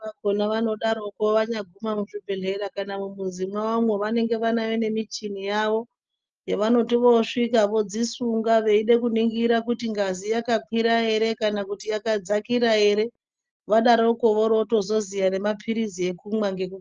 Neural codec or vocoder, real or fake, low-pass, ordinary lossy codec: none; real; 7.2 kHz; Opus, 24 kbps